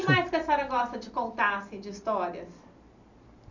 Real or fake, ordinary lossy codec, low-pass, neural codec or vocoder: real; none; 7.2 kHz; none